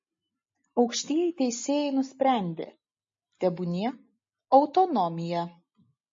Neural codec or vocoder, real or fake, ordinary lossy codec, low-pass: none; real; MP3, 32 kbps; 7.2 kHz